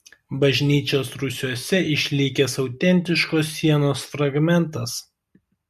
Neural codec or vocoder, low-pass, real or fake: none; 14.4 kHz; real